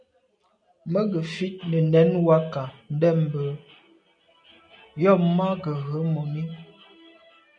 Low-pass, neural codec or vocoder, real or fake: 9.9 kHz; none; real